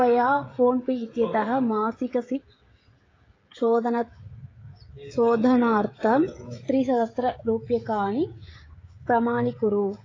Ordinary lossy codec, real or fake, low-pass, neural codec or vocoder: AAC, 32 kbps; fake; 7.2 kHz; codec, 16 kHz, 16 kbps, FreqCodec, smaller model